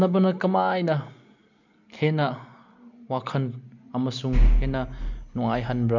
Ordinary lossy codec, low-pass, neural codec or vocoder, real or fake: none; 7.2 kHz; none; real